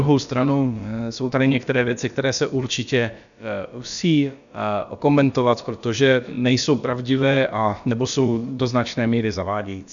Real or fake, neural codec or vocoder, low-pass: fake; codec, 16 kHz, about 1 kbps, DyCAST, with the encoder's durations; 7.2 kHz